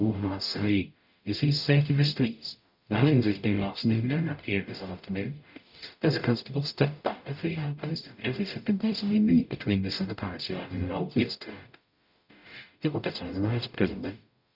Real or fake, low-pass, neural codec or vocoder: fake; 5.4 kHz; codec, 44.1 kHz, 0.9 kbps, DAC